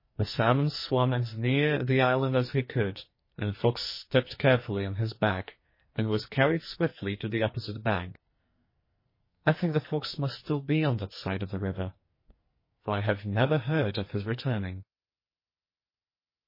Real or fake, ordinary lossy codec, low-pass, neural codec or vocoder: fake; MP3, 24 kbps; 5.4 kHz; codec, 44.1 kHz, 2.6 kbps, SNAC